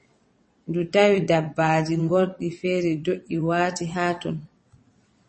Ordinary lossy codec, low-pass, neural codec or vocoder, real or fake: MP3, 32 kbps; 9.9 kHz; vocoder, 22.05 kHz, 80 mel bands, WaveNeXt; fake